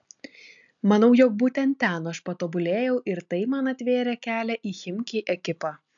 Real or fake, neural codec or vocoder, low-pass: real; none; 7.2 kHz